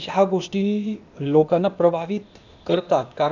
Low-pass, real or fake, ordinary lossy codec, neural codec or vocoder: 7.2 kHz; fake; none; codec, 16 kHz, 0.8 kbps, ZipCodec